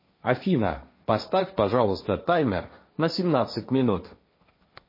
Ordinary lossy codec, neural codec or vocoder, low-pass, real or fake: MP3, 24 kbps; codec, 16 kHz, 1.1 kbps, Voila-Tokenizer; 5.4 kHz; fake